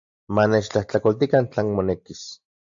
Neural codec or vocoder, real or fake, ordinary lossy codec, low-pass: none; real; AAC, 64 kbps; 7.2 kHz